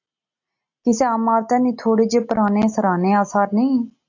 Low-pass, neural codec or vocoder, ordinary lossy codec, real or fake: 7.2 kHz; none; AAC, 48 kbps; real